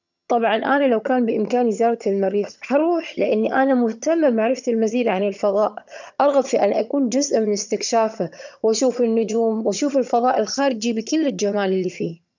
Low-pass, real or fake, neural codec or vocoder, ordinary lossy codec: 7.2 kHz; fake; vocoder, 22.05 kHz, 80 mel bands, HiFi-GAN; none